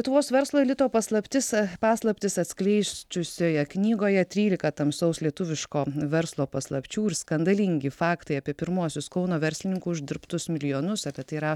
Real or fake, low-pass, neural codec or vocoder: real; 19.8 kHz; none